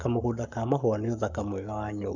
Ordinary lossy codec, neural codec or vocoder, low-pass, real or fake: none; codec, 44.1 kHz, 7.8 kbps, Pupu-Codec; 7.2 kHz; fake